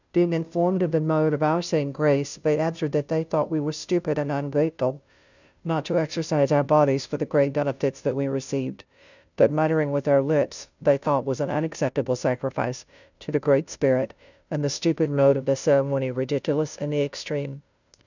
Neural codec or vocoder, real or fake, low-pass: codec, 16 kHz, 0.5 kbps, FunCodec, trained on Chinese and English, 25 frames a second; fake; 7.2 kHz